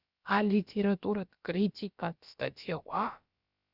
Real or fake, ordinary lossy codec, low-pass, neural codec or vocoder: fake; Opus, 64 kbps; 5.4 kHz; codec, 16 kHz, about 1 kbps, DyCAST, with the encoder's durations